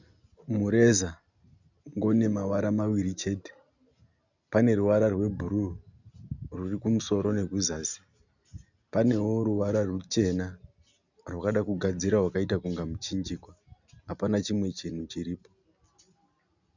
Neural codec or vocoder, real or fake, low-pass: none; real; 7.2 kHz